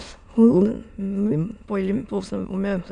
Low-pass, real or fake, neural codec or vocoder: 9.9 kHz; fake; autoencoder, 22.05 kHz, a latent of 192 numbers a frame, VITS, trained on many speakers